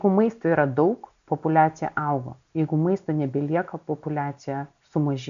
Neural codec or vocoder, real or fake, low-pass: none; real; 7.2 kHz